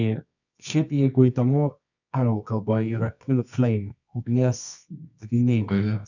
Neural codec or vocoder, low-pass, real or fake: codec, 24 kHz, 0.9 kbps, WavTokenizer, medium music audio release; 7.2 kHz; fake